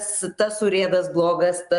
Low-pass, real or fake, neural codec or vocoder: 10.8 kHz; real; none